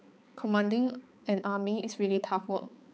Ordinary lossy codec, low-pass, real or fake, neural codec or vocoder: none; none; fake; codec, 16 kHz, 4 kbps, X-Codec, HuBERT features, trained on balanced general audio